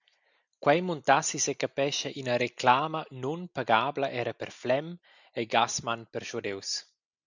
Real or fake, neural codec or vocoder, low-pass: real; none; 7.2 kHz